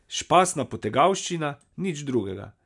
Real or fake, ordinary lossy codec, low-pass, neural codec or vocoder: real; none; 10.8 kHz; none